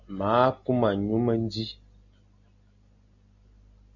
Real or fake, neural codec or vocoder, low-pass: real; none; 7.2 kHz